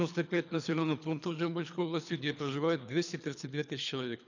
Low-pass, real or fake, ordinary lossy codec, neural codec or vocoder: 7.2 kHz; fake; none; codec, 24 kHz, 3 kbps, HILCodec